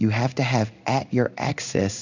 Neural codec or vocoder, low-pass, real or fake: codec, 16 kHz in and 24 kHz out, 1 kbps, XY-Tokenizer; 7.2 kHz; fake